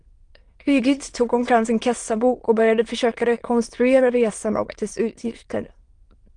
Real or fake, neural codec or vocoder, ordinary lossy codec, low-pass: fake; autoencoder, 22.05 kHz, a latent of 192 numbers a frame, VITS, trained on many speakers; Opus, 24 kbps; 9.9 kHz